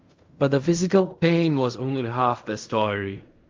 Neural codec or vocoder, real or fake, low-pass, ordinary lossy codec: codec, 16 kHz in and 24 kHz out, 0.4 kbps, LongCat-Audio-Codec, fine tuned four codebook decoder; fake; 7.2 kHz; Opus, 32 kbps